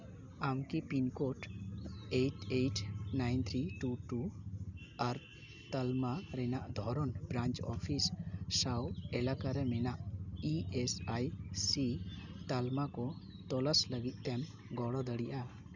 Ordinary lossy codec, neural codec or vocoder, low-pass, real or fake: none; none; 7.2 kHz; real